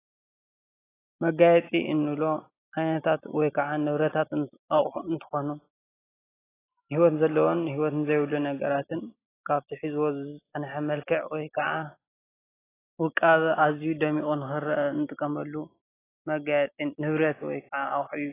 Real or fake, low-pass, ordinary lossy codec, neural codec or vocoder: real; 3.6 kHz; AAC, 16 kbps; none